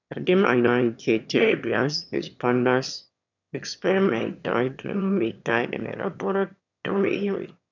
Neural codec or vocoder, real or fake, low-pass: autoencoder, 22.05 kHz, a latent of 192 numbers a frame, VITS, trained on one speaker; fake; 7.2 kHz